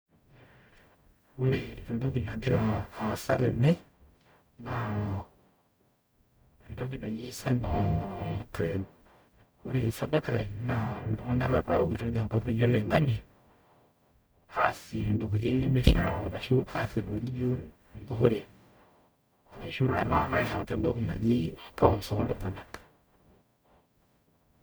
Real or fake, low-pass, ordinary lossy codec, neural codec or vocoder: fake; none; none; codec, 44.1 kHz, 0.9 kbps, DAC